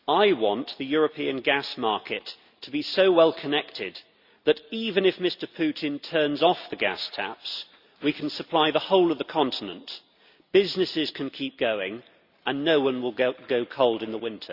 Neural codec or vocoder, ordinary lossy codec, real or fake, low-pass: none; Opus, 64 kbps; real; 5.4 kHz